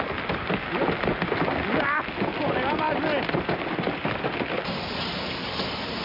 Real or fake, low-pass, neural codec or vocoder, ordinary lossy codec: real; 5.4 kHz; none; MP3, 48 kbps